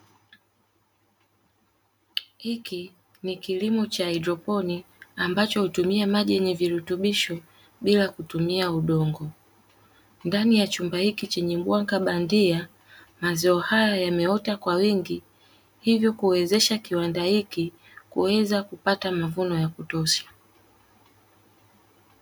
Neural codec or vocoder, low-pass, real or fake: none; 19.8 kHz; real